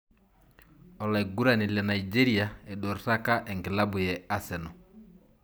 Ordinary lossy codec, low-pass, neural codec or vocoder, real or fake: none; none; none; real